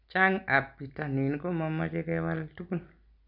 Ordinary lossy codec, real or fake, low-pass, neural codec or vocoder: none; real; 5.4 kHz; none